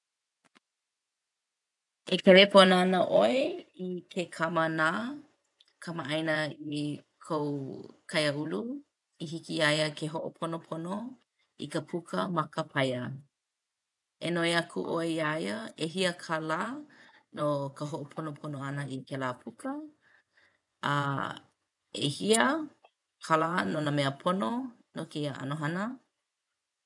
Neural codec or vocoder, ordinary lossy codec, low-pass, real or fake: vocoder, 24 kHz, 100 mel bands, Vocos; none; 10.8 kHz; fake